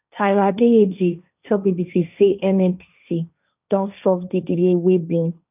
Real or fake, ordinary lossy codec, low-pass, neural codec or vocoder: fake; none; 3.6 kHz; codec, 16 kHz, 1.1 kbps, Voila-Tokenizer